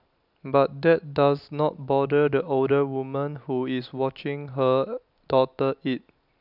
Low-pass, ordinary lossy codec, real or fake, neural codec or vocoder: 5.4 kHz; none; real; none